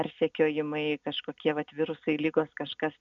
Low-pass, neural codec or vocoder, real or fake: 7.2 kHz; none; real